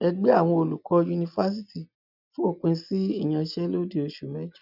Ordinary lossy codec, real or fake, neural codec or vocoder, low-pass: none; real; none; 5.4 kHz